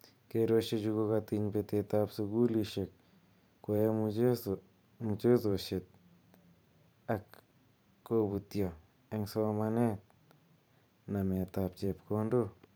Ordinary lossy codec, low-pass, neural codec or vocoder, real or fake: none; none; vocoder, 44.1 kHz, 128 mel bands every 512 samples, BigVGAN v2; fake